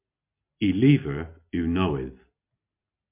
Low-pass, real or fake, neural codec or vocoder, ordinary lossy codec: 3.6 kHz; real; none; MP3, 32 kbps